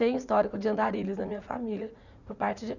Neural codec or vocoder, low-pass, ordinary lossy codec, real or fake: vocoder, 44.1 kHz, 80 mel bands, Vocos; 7.2 kHz; none; fake